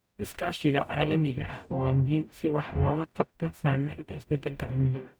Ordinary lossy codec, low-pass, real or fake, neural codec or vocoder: none; none; fake; codec, 44.1 kHz, 0.9 kbps, DAC